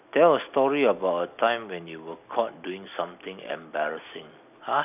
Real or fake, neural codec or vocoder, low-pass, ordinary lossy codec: real; none; 3.6 kHz; none